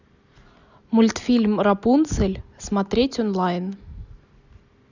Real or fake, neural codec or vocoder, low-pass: real; none; 7.2 kHz